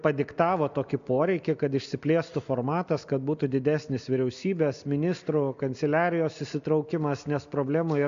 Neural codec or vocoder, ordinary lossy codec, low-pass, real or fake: none; MP3, 64 kbps; 7.2 kHz; real